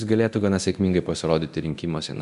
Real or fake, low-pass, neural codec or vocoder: fake; 10.8 kHz; codec, 24 kHz, 0.9 kbps, DualCodec